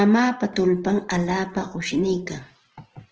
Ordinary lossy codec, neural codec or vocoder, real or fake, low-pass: Opus, 16 kbps; vocoder, 44.1 kHz, 128 mel bands every 512 samples, BigVGAN v2; fake; 7.2 kHz